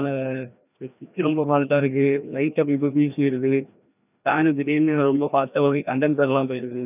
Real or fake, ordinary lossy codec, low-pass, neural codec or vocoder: fake; none; 3.6 kHz; codec, 16 kHz, 1 kbps, FreqCodec, larger model